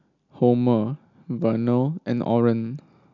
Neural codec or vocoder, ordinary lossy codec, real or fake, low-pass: none; none; real; 7.2 kHz